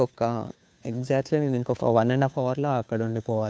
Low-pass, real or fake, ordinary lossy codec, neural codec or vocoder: none; fake; none; codec, 16 kHz, 2 kbps, FunCodec, trained on Chinese and English, 25 frames a second